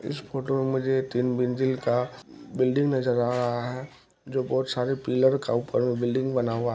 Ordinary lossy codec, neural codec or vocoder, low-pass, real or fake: none; none; none; real